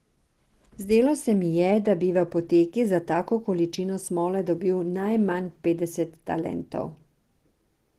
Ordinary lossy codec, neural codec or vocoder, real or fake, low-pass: Opus, 16 kbps; none; real; 14.4 kHz